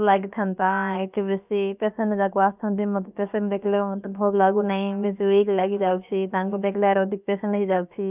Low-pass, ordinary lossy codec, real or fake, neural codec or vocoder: 3.6 kHz; none; fake; codec, 16 kHz, about 1 kbps, DyCAST, with the encoder's durations